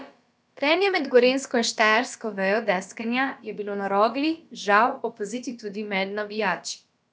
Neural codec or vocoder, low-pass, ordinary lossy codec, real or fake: codec, 16 kHz, about 1 kbps, DyCAST, with the encoder's durations; none; none; fake